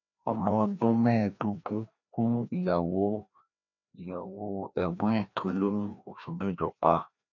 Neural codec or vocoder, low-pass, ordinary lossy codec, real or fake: codec, 16 kHz, 1 kbps, FreqCodec, larger model; 7.2 kHz; none; fake